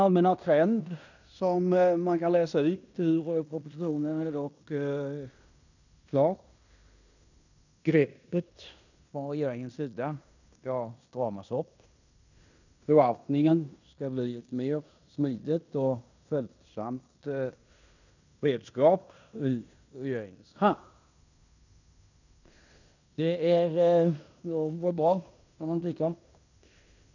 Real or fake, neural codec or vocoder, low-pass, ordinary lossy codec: fake; codec, 16 kHz in and 24 kHz out, 0.9 kbps, LongCat-Audio-Codec, fine tuned four codebook decoder; 7.2 kHz; none